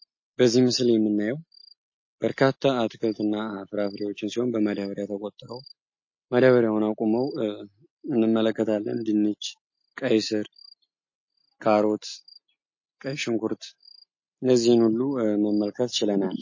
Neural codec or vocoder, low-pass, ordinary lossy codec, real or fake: none; 7.2 kHz; MP3, 32 kbps; real